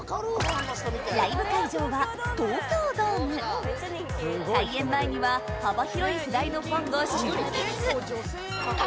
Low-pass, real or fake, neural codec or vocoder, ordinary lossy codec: none; real; none; none